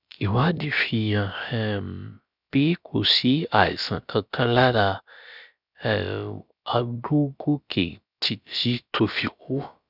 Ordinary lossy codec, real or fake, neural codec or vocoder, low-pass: none; fake; codec, 16 kHz, about 1 kbps, DyCAST, with the encoder's durations; 5.4 kHz